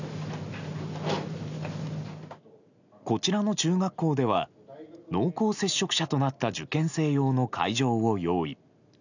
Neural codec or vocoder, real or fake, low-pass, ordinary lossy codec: none; real; 7.2 kHz; none